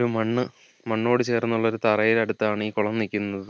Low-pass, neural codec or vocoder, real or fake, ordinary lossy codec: none; none; real; none